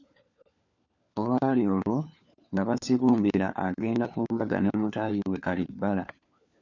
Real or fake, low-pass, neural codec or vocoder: fake; 7.2 kHz; codec, 16 kHz, 4 kbps, FunCodec, trained on LibriTTS, 50 frames a second